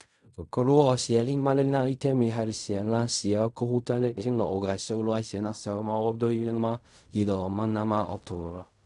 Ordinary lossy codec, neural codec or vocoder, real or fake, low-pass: none; codec, 16 kHz in and 24 kHz out, 0.4 kbps, LongCat-Audio-Codec, fine tuned four codebook decoder; fake; 10.8 kHz